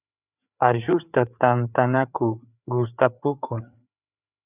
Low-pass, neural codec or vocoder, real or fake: 3.6 kHz; codec, 16 kHz, 4 kbps, FreqCodec, larger model; fake